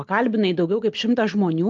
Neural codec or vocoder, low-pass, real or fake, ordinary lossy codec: none; 7.2 kHz; real; Opus, 32 kbps